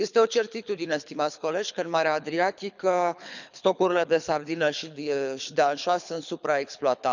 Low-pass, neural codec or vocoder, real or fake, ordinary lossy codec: 7.2 kHz; codec, 24 kHz, 6 kbps, HILCodec; fake; none